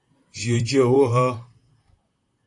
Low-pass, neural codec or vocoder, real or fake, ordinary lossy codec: 10.8 kHz; vocoder, 44.1 kHz, 128 mel bands, Pupu-Vocoder; fake; AAC, 48 kbps